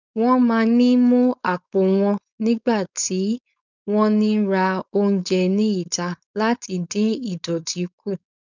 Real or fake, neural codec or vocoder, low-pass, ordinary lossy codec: fake; codec, 16 kHz, 4.8 kbps, FACodec; 7.2 kHz; none